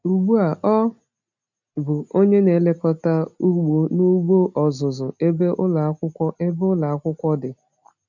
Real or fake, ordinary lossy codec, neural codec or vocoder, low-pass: real; none; none; 7.2 kHz